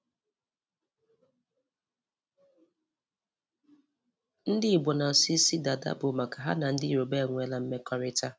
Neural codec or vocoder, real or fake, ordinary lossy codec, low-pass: none; real; none; none